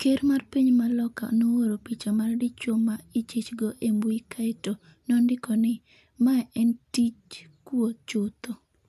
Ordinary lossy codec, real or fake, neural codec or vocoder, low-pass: none; real; none; 14.4 kHz